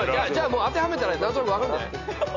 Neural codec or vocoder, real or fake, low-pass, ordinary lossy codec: none; real; 7.2 kHz; none